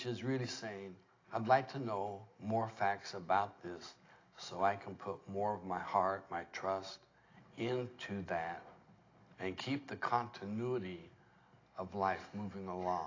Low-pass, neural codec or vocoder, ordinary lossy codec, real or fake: 7.2 kHz; none; AAC, 32 kbps; real